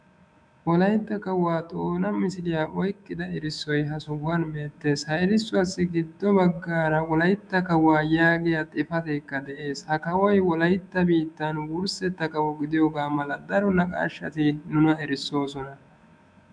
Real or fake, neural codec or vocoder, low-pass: fake; autoencoder, 48 kHz, 128 numbers a frame, DAC-VAE, trained on Japanese speech; 9.9 kHz